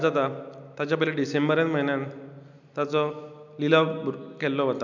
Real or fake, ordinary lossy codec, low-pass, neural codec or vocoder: real; none; 7.2 kHz; none